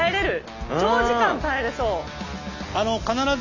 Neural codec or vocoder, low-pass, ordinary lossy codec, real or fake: none; 7.2 kHz; none; real